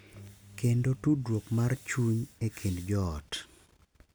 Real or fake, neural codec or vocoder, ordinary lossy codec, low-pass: real; none; none; none